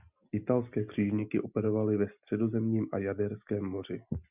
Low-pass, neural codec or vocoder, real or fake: 3.6 kHz; none; real